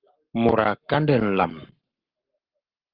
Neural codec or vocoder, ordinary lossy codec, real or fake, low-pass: codec, 44.1 kHz, 7.8 kbps, Pupu-Codec; Opus, 32 kbps; fake; 5.4 kHz